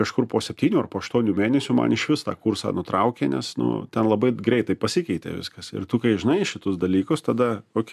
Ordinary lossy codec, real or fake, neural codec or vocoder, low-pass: AAC, 96 kbps; real; none; 14.4 kHz